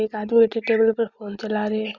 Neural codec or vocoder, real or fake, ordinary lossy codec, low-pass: none; real; Opus, 64 kbps; 7.2 kHz